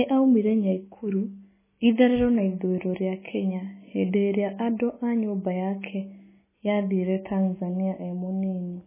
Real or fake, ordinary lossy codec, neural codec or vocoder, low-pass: real; MP3, 16 kbps; none; 3.6 kHz